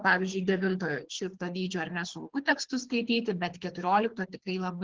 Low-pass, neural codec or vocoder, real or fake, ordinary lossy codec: 7.2 kHz; codec, 24 kHz, 6 kbps, HILCodec; fake; Opus, 16 kbps